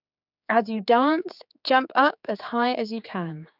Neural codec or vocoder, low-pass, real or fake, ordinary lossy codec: codec, 16 kHz, 4 kbps, X-Codec, HuBERT features, trained on general audio; 5.4 kHz; fake; none